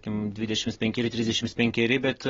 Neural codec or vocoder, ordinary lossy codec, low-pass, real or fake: none; AAC, 24 kbps; 7.2 kHz; real